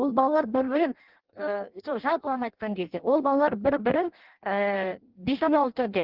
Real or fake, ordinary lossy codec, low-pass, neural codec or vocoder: fake; Opus, 16 kbps; 5.4 kHz; codec, 16 kHz in and 24 kHz out, 0.6 kbps, FireRedTTS-2 codec